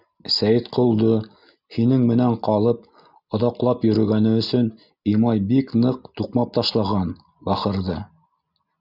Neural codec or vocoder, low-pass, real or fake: none; 5.4 kHz; real